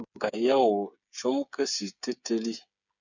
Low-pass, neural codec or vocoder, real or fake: 7.2 kHz; codec, 16 kHz, 8 kbps, FreqCodec, smaller model; fake